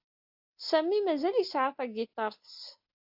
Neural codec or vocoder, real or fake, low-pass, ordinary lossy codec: none; real; 5.4 kHz; AAC, 48 kbps